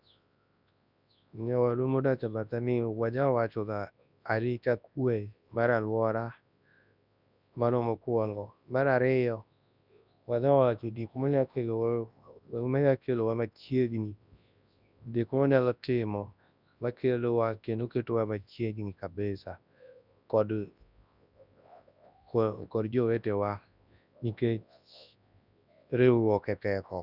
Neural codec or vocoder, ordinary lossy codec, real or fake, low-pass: codec, 24 kHz, 0.9 kbps, WavTokenizer, large speech release; none; fake; 5.4 kHz